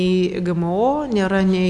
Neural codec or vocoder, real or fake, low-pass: none; real; 10.8 kHz